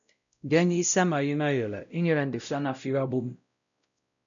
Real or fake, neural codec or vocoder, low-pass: fake; codec, 16 kHz, 0.5 kbps, X-Codec, WavLM features, trained on Multilingual LibriSpeech; 7.2 kHz